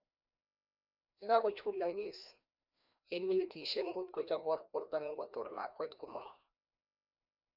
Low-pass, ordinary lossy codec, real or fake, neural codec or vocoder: 5.4 kHz; none; fake; codec, 16 kHz, 1 kbps, FreqCodec, larger model